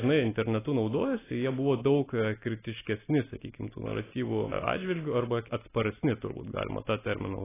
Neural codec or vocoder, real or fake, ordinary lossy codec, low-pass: none; real; AAC, 16 kbps; 3.6 kHz